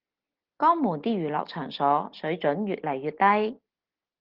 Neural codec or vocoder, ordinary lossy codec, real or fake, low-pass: none; Opus, 16 kbps; real; 5.4 kHz